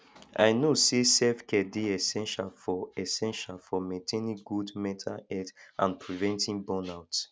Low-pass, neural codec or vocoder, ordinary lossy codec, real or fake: none; none; none; real